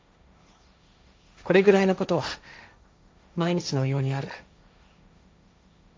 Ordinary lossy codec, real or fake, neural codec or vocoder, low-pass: none; fake; codec, 16 kHz, 1.1 kbps, Voila-Tokenizer; none